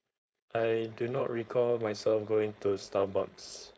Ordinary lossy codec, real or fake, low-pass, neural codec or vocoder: none; fake; none; codec, 16 kHz, 4.8 kbps, FACodec